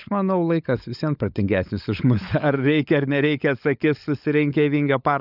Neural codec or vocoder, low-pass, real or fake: codec, 16 kHz, 16 kbps, FunCodec, trained on LibriTTS, 50 frames a second; 5.4 kHz; fake